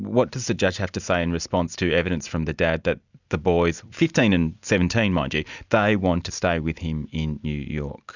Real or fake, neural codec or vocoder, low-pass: real; none; 7.2 kHz